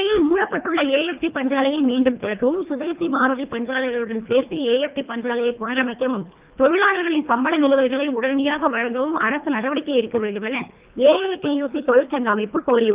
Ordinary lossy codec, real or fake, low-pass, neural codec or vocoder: Opus, 24 kbps; fake; 3.6 kHz; codec, 24 kHz, 1.5 kbps, HILCodec